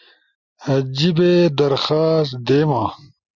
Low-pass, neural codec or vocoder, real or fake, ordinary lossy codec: 7.2 kHz; none; real; Opus, 64 kbps